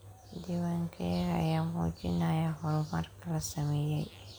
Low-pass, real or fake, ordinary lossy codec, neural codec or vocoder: none; real; none; none